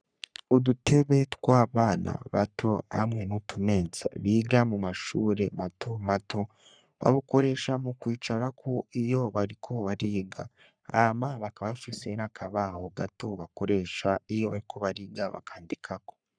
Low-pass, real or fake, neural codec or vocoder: 9.9 kHz; fake; codec, 44.1 kHz, 3.4 kbps, Pupu-Codec